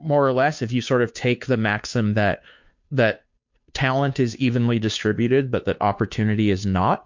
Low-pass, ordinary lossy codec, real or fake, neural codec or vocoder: 7.2 kHz; MP3, 48 kbps; fake; codec, 16 kHz, 2 kbps, FunCodec, trained on Chinese and English, 25 frames a second